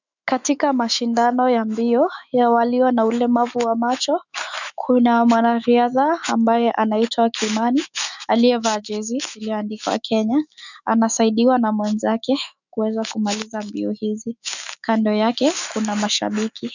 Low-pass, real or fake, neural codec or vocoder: 7.2 kHz; fake; autoencoder, 48 kHz, 128 numbers a frame, DAC-VAE, trained on Japanese speech